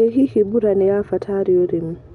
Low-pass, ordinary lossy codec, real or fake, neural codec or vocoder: 10.8 kHz; none; real; none